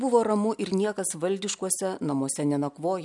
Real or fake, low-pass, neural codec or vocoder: real; 10.8 kHz; none